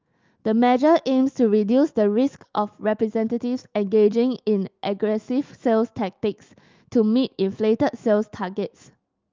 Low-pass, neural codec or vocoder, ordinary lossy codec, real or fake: 7.2 kHz; autoencoder, 48 kHz, 128 numbers a frame, DAC-VAE, trained on Japanese speech; Opus, 24 kbps; fake